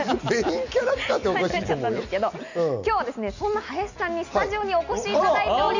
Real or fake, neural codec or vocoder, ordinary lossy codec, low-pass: real; none; none; 7.2 kHz